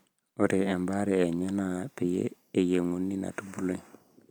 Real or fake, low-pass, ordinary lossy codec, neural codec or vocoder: real; none; none; none